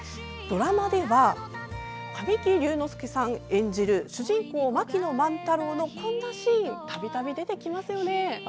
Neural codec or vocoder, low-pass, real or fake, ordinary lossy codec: none; none; real; none